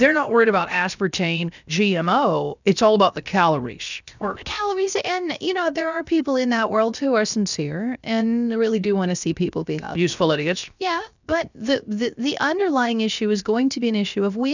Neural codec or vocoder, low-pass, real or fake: codec, 16 kHz, 0.7 kbps, FocalCodec; 7.2 kHz; fake